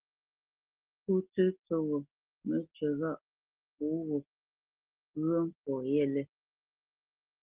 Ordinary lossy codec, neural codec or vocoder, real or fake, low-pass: Opus, 16 kbps; none; real; 3.6 kHz